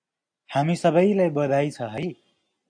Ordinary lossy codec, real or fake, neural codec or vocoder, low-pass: AAC, 64 kbps; real; none; 9.9 kHz